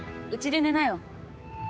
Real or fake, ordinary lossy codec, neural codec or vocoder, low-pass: fake; none; codec, 16 kHz, 4 kbps, X-Codec, HuBERT features, trained on general audio; none